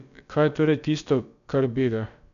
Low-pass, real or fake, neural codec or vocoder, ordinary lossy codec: 7.2 kHz; fake; codec, 16 kHz, about 1 kbps, DyCAST, with the encoder's durations; none